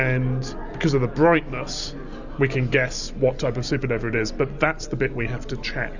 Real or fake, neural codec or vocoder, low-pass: real; none; 7.2 kHz